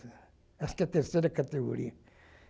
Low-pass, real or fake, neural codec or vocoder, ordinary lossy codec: none; real; none; none